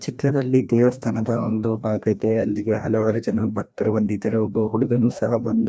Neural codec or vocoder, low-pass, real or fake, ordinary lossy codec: codec, 16 kHz, 1 kbps, FreqCodec, larger model; none; fake; none